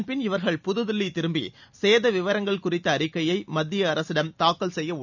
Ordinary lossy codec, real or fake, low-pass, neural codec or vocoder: none; real; 7.2 kHz; none